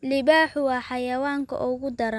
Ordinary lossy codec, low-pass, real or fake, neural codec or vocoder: none; none; real; none